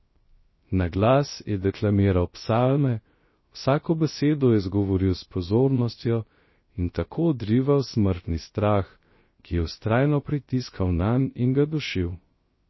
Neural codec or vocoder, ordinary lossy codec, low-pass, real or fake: codec, 16 kHz, 0.3 kbps, FocalCodec; MP3, 24 kbps; 7.2 kHz; fake